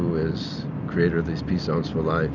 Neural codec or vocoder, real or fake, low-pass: none; real; 7.2 kHz